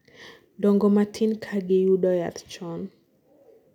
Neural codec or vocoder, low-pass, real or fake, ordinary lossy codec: none; 19.8 kHz; real; none